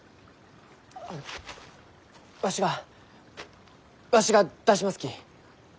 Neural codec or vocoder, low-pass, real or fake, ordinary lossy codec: none; none; real; none